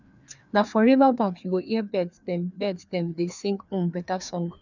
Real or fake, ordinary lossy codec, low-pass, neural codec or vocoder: fake; none; 7.2 kHz; codec, 16 kHz, 2 kbps, FreqCodec, larger model